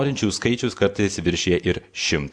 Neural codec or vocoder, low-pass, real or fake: none; 9.9 kHz; real